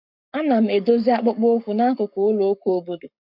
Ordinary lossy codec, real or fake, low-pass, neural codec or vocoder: none; fake; 5.4 kHz; codec, 44.1 kHz, 7.8 kbps, Pupu-Codec